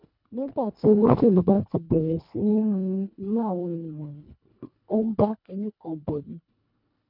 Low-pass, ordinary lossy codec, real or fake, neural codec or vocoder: 5.4 kHz; none; fake; codec, 24 kHz, 1.5 kbps, HILCodec